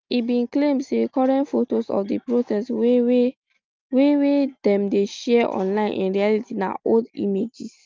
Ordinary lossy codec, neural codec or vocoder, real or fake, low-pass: Opus, 24 kbps; none; real; 7.2 kHz